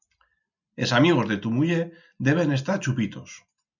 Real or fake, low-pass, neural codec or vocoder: real; 7.2 kHz; none